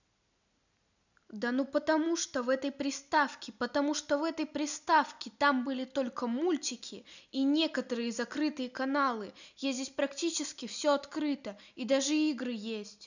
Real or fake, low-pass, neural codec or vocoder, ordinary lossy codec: real; 7.2 kHz; none; none